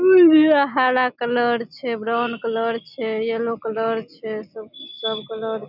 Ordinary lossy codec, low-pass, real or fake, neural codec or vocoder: none; 5.4 kHz; real; none